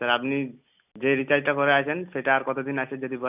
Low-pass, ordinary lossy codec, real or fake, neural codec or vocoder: 3.6 kHz; none; real; none